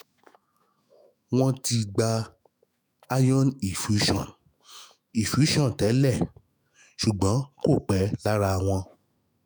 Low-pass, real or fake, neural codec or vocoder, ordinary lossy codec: none; fake; autoencoder, 48 kHz, 128 numbers a frame, DAC-VAE, trained on Japanese speech; none